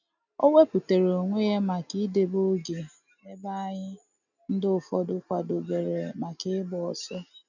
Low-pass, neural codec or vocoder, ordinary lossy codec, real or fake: 7.2 kHz; none; none; real